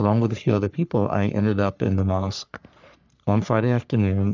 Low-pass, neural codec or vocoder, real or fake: 7.2 kHz; codec, 44.1 kHz, 3.4 kbps, Pupu-Codec; fake